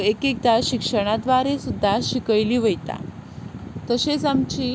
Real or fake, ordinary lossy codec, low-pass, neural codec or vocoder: real; none; none; none